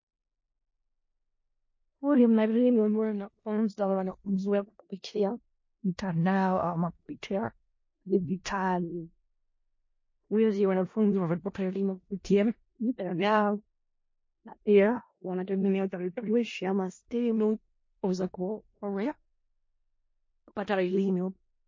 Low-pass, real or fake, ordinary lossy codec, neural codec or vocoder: 7.2 kHz; fake; MP3, 32 kbps; codec, 16 kHz in and 24 kHz out, 0.4 kbps, LongCat-Audio-Codec, four codebook decoder